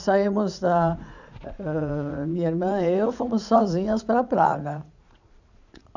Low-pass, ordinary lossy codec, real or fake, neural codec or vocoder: 7.2 kHz; none; fake; vocoder, 44.1 kHz, 80 mel bands, Vocos